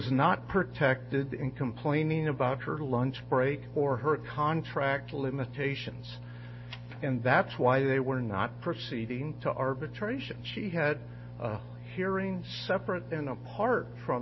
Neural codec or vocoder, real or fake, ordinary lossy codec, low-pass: none; real; MP3, 24 kbps; 7.2 kHz